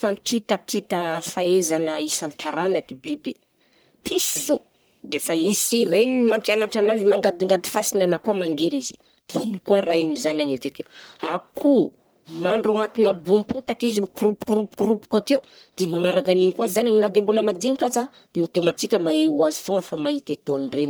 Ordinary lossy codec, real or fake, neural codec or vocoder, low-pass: none; fake; codec, 44.1 kHz, 1.7 kbps, Pupu-Codec; none